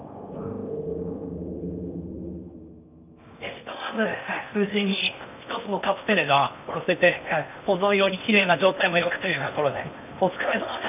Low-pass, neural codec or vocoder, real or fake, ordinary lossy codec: 3.6 kHz; codec, 16 kHz in and 24 kHz out, 0.8 kbps, FocalCodec, streaming, 65536 codes; fake; none